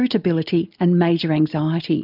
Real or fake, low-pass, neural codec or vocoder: real; 5.4 kHz; none